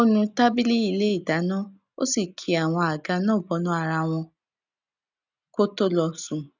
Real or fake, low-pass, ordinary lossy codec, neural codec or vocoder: real; 7.2 kHz; none; none